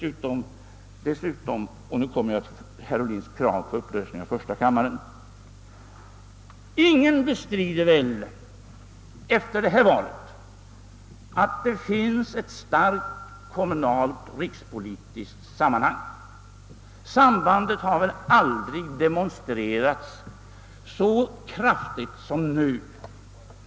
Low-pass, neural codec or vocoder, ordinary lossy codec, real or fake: none; none; none; real